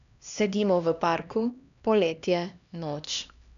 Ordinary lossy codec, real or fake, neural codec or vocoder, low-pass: none; fake; codec, 16 kHz, 1 kbps, X-Codec, HuBERT features, trained on LibriSpeech; 7.2 kHz